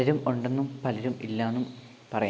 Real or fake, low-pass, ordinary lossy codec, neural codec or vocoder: real; none; none; none